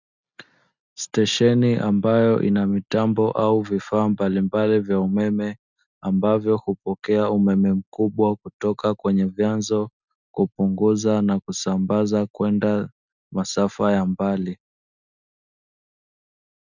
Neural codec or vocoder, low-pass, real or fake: none; 7.2 kHz; real